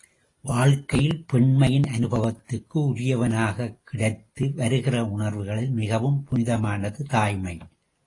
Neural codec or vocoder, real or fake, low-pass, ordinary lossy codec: none; real; 10.8 kHz; AAC, 32 kbps